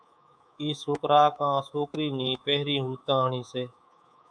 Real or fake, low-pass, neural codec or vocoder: fake; 9.9 kHz; codec, 24 kHz, 3.1 kbps, DualCodec